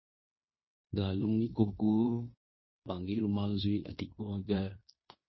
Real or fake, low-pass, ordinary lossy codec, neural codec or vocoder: fake; 7.2 kHz; MP3, 24 kbps; codec, 16 kHz in and 24 kHz out, 0.9 kbps, LongCat-Audio-Codec, fine tuned four codebook decoder